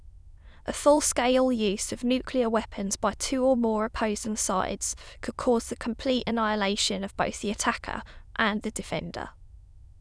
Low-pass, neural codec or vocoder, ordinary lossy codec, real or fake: none; autoencoder, 22.05 kHz, a latent of 192 numbers a frame, VITS, trained on many speakers; none; fake